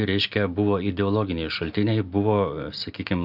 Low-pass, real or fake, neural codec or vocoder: 5.4 kHz; real; none